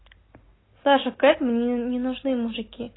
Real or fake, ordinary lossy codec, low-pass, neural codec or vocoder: real; AAC, 16 kbps; 7.2 kHz; none